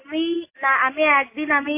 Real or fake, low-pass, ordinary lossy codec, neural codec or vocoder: real; 3.6 kHz; MP3, 24 kbps; none